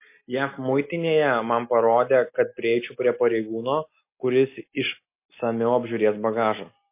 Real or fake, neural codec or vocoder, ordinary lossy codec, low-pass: real; none; MP3, 24 kbps; 3.6 kHz